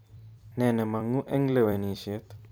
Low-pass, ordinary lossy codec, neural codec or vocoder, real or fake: none; none; vocoder, 44.1 kHz, 128 mel bands every 256 samples, BigVGAN v2; fake